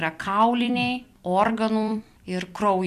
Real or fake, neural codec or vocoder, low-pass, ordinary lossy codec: fake; vocoder, 44.1 kHz, 128 mel bands every 256 samples, BigVGAN v2; 14.4 kHz; MP3, 96 kbps